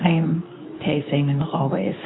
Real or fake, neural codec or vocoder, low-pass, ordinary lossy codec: fake; codec, 24 kHz, 0.9 kbps, WavTokenizer, medium speech release version 2; 7.2 kHz; AAC, 16 kbps